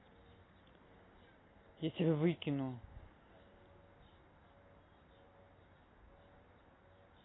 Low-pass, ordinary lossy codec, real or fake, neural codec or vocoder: 7.2 kHz; AAC, 16 kbps; real; none